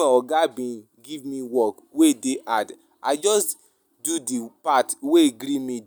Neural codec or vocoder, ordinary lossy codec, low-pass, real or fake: none; none; none; real